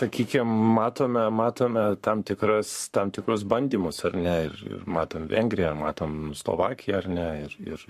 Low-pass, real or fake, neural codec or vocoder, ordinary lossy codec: 14.4 kHz; fake; codec, 44.1 kHz, 7.8 kbps, Pupu-Codec; MP3, 64 kbps